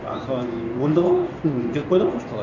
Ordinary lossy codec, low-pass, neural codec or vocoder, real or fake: none; 7.2 kHz; codec, 16 kHz in and 24 kHz out, 1 kbps, XY-Tokenizer; fake